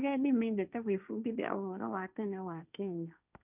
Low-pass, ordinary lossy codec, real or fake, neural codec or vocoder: 3.6 kHz; none; fake; codec, 16 kHz, 1.1 kbps, Voila-Tokenizer